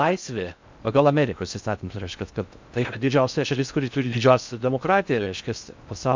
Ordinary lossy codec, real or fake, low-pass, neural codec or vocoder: MP3, 64 kbps; fake; 7.2 kHz; codec, 16 kHz in and 24 kHz out, 0.6 kbps, FocalCodec, streaming, 4096 codes